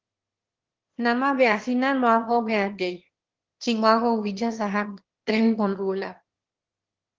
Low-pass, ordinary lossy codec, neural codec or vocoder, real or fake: 7.2 kHz; Opus, 16 kbps; autoencoder, 22.05 kHz, a latent of 192 numbers a frame, VITS, trained on one speaker; fake